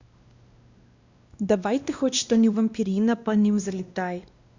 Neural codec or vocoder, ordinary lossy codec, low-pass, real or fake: codec, 16 kHz, 1 kbps, X-Codec, WavLM features, trained on Multilingual LibriSpeech; Opus, 64 kbps; 7.2 kHz; fake